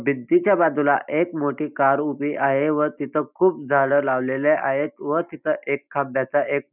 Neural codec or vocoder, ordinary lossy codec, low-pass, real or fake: none; none; 3.6 kHz; real